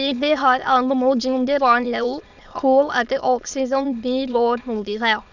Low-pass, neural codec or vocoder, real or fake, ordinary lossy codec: 7.2 kHz; autoencoder, 22.05 kHz, a latent of 192 numbers a frame, VITS, trained on many speakers; fake; none